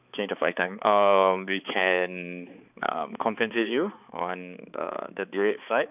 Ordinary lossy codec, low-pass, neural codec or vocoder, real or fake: none; 3.6 kHz; codec, 16 kHz, 4 kbps, X-Codec, HuBERT features, trained on balanced general audio; fake